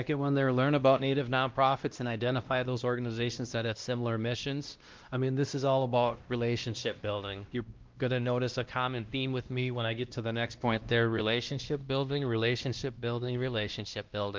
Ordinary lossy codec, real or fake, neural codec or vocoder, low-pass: Opus, 32 kbps; fake; codec, 16 kHz, 1 kbps, X-Codec, WavLM features, trained on Multilingual LibriSpeech; 7.2 kHz